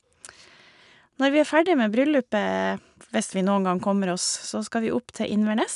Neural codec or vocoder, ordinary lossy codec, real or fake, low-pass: none; none; real; 10.8 kHz